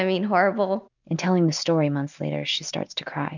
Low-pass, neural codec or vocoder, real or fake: 7.2 kHz; none; real